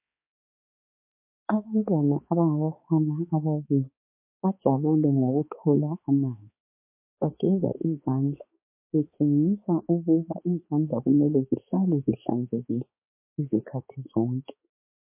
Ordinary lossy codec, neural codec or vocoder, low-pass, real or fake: MP3, 24 kbps; codec, 16 kHz, 4 kbps, X-Codec, HuBERT features, trained on balanced general audio; 3.6 kHz; fake